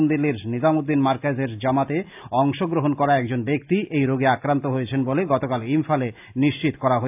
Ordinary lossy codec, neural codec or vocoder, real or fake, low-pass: AAC, 32 kbps; none; real; 3.6 kHz